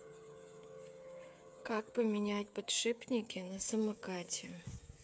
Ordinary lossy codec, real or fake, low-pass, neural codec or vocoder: none; fake; none; codec, 16 kHz, 8 kbps, FreqCodec, smaller model